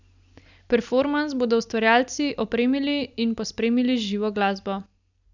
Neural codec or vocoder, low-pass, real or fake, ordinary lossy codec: none; 7.2 kHz; real; none